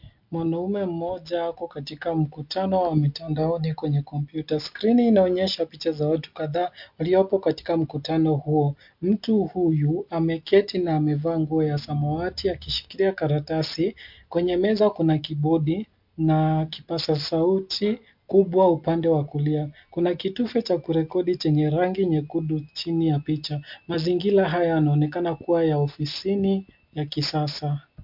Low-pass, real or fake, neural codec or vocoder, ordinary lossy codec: 5.4 kHz; real; none; MP3, 48 kbps